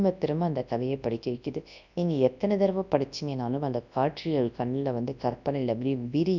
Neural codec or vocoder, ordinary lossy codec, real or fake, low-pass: codec, 24 kHz, 0.9 kbps, WavTokenizer, large speech release; none; fake; 7.2 kHz